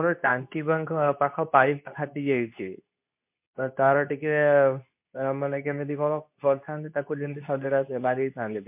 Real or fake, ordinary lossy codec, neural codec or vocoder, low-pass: fake; MP3, 32 kbps; codec, 24 kHz, 0.9 kbps, WavTokenizer, medium speech release version 2; 3.6 kHz